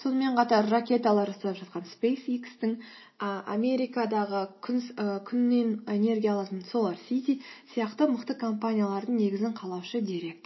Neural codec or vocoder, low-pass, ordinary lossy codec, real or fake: none; 7.2 kHz; MP3, 24 kbps; real